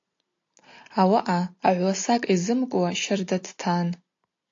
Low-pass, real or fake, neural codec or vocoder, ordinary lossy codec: 7.2 kHz; real; none; AAC, 48 kbps